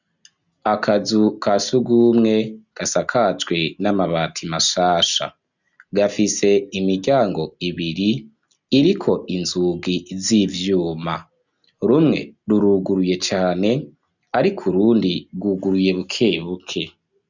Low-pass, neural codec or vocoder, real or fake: 7.2 kHz; none; real